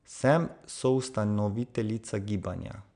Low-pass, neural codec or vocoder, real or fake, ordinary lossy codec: 9.9 kHz; none; real; none